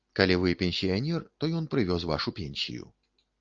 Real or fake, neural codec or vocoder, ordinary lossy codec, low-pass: real; none; Opus, 24 kbps; 7.2 kHz